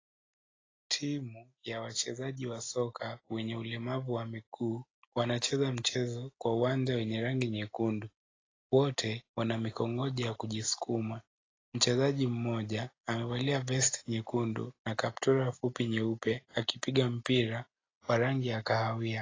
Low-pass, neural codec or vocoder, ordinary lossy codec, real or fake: 7.2 kHz; none; AAC, 32 kbps; real